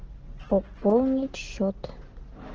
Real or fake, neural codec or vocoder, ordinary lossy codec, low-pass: real; none; Opus, 16 kbps; 7.2 kHz